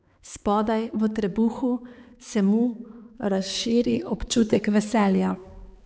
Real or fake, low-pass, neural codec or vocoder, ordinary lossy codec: fake; none; codec, 16 kHz, 4 kbps, X-Codec, HuBERT features, trained on balanced general audio; none